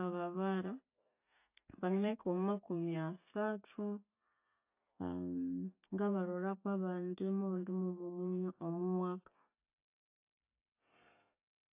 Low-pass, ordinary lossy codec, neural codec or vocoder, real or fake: 3.6 kHz; AAC, 32 kbps; none; real